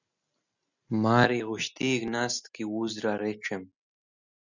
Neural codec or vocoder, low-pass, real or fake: none; 7.2 kHz; real